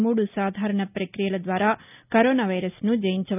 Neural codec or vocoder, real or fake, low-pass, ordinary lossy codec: none; real; 3.6 kHz; none